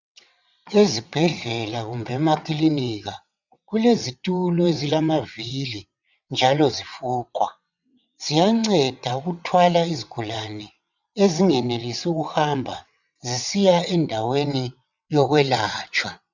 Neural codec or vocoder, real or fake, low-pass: vocoder, 22.05 kHz, 80 mel bands, WaveNeXt; fake; 7.2 kHz